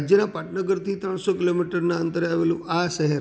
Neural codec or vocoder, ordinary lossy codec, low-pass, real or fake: none; none; none; real